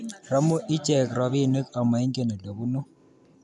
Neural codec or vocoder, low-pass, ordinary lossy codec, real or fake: none; none; none; real